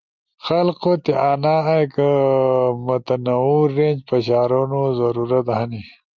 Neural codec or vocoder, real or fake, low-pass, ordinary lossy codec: none; real; 7.2 kHz; Opus, 32 kbps